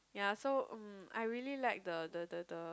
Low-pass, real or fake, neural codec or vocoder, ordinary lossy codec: none; real; none; none